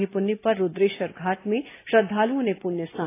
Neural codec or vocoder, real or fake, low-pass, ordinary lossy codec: none; real; 3.6 kHz; AAC, 24 kbps